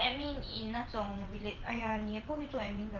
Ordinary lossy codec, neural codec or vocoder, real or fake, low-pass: Opus, 24 kbps; vocoder, 22.05 kHz, 80 mel bands, WaveNeXt; fake; 7.2 kHz